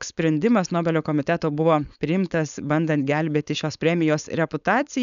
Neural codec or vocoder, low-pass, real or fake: codec, 16 kHz, 4.8 kbps, FACodec; 7.2 kHz; fake